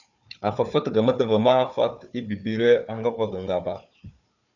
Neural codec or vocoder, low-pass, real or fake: codec, 16 kHz, 4 kbps, FunCodec, trained on Chinese and English, 50 frames a second; 7.2 kHz; fake